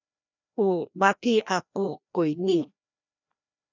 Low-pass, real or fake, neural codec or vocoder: 7.2 kHz; fake; codec, 16 kHz, 1 kbps, FreqCodec, larger model